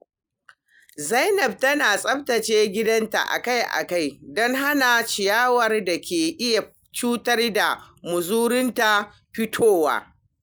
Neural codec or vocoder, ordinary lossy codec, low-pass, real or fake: none; none; none; real